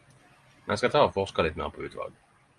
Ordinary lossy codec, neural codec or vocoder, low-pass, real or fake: Opus, 32 kbps; none; 10.8 kHz; real